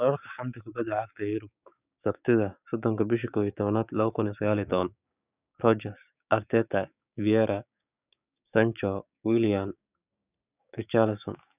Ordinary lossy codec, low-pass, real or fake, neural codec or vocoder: none; 3.6 kHz; fake; codec, 16 kHz, 6 kbps, DAC